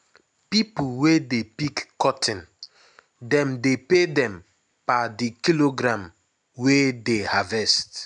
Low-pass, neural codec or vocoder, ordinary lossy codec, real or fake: 10.8 kHz; none; none; real